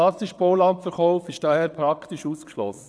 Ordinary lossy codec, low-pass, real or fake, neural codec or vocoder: none; none; fake; vocoder, 22.05 kHz, 80 mel bands, WaveNeXt